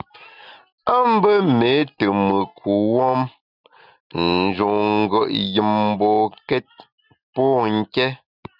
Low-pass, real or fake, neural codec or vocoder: 5.4 kHz; real; none